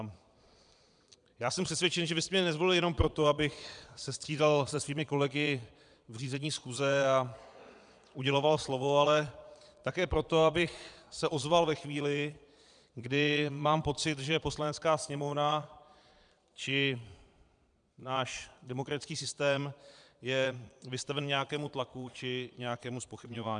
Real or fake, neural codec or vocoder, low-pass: fake; vocoder, 22.05 kHz, 80 mel bands, Vocos; 9.9 kHz